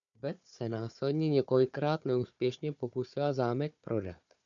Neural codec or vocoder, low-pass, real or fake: codec, 16 kHz, 4 kbps, FunCodec, trained on Chinese and English, 50 frames a second; 7.2 kHz; fake